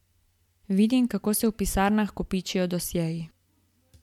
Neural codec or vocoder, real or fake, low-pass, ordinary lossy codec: none; real; 19.8 kHz; MP3, 96 kbps